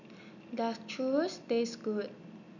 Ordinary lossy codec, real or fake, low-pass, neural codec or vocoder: none; real; 7.2 kHz; none